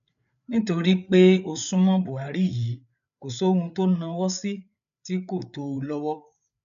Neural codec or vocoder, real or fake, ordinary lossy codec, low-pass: codec, 16 kHz, 8 kbps, FreqCodec, larger model; fake; none; 7.2 kHz